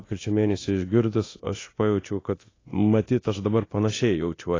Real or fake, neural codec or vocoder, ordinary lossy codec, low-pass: fake; codec, 24 kHz, 0.9 kbps, DualCodec; AAC, 32 kbps; 7.2 kHz